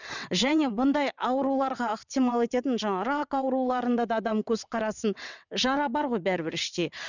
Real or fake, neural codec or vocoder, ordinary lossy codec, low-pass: fake; vocoder, 22.05 kHz, 80 mel bands, WaveNeXt; none; 7.2 kHz